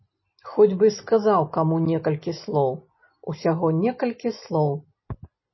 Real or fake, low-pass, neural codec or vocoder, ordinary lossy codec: real; 7.2 kHz; none; MP3, 24 kbps